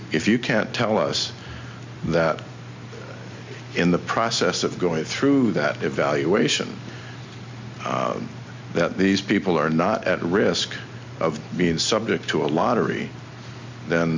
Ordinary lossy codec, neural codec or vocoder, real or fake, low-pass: MP3, 64 kbps; none; real; 7.2 kHz